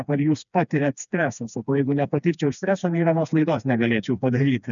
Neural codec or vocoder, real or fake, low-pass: codec, 16 kHz, 2 kbps, FreqCodec, smaller model; fake; 7.2 kHz